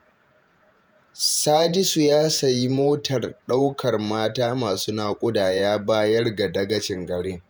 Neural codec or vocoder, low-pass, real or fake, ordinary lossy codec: vocoder, 48 kHz, 128 mel bands, Vocos; none; fake; none